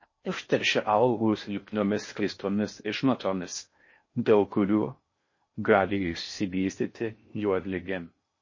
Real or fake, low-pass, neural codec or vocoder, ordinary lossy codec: fake; 7.2 kHz; codec, 16 kHz in and 24 kHz out, 0.6 kbps, FocalCodec, streaming, 4096 codes; MP3, 32 kbps